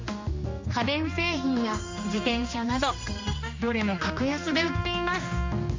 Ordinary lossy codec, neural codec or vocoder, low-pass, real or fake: MP3, 48 kbps; codec, 16 kHz, 2 kbps, X-Codec, HuBERT features, trained on general audio; 7.2 kHz; fake